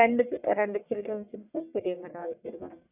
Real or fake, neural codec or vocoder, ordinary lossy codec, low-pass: fake; codec, 44.1 kHz, 1.7 kbps, Pupu-Codec; none; 3.6 kHz